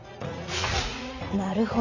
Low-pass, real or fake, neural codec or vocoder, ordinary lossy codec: 7.2 kHz; fake; vocoder, 44.1 kHz, 80 mel bands, Vocos; none